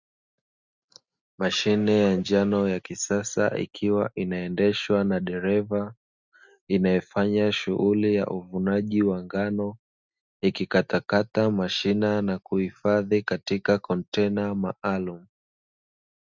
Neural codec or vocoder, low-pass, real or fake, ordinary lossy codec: none; 7.2 kHz; real; Opus, 64 kbps